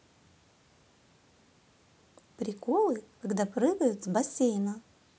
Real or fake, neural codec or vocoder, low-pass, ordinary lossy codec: real; none; none; none